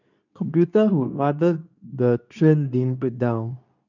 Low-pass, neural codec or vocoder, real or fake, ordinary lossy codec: 7.2 kHz; codec, 24 kHz, 0.9 kbps, WavTokenizer, medium speech release version 2; fake; none